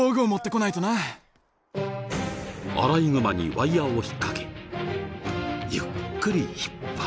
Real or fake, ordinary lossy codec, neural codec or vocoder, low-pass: real; none; none; none